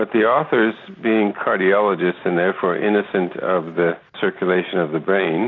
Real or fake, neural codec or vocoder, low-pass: real; none; 7.2 kHz